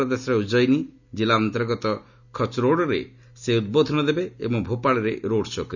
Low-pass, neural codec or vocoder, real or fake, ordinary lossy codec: 7.2 kHz; none; real; none